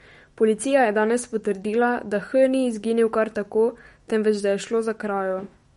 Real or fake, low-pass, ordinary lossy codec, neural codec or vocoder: fake; 19.8 kHz; MP3, 48 kbps; autoencoder, 48 kHz, 128 numbers a frame, DAC-VAE, trained on Japanese speech